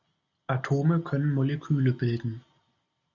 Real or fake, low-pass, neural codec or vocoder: real; 7.2 kHz; none